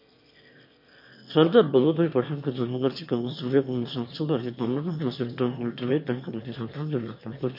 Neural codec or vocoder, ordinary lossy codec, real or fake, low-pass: autoencoder, 22.05 kHz, a latent of 192 numbers a frame, VITS, trained on one speaker; MP3, 32 kbps; fake; 5.4 kHz